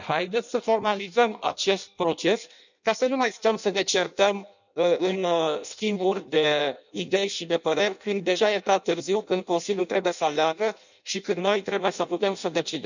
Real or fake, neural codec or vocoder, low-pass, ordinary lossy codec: fake; codec, 16 kHz in and 24 kHz out, 0.6 kbps, FireRedTTS-2 codec; 7.2 kHz; none